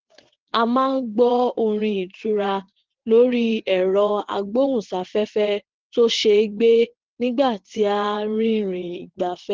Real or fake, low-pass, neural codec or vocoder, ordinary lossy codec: fake; 7.2 kHz; vocoder, 22.05 kHz, 80 mel bands, WaveNeXt; Opus, 16 kbps